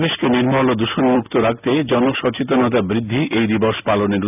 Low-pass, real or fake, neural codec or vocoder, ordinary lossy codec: 3.6 kHz; real; none; none